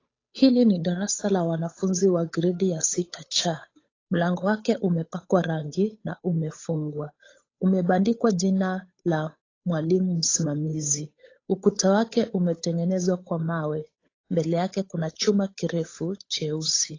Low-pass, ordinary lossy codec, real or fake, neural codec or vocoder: 7.2 kHz; AAC, 32 kbps; fake; codec, 16 kHz, 8 kbps, FunCodec, trained on Chinese and English, 25 frames a second